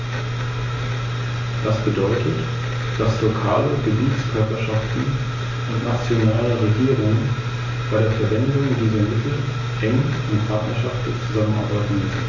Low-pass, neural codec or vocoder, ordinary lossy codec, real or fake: 7.2 kHz; none; MP3, 32 kbps; real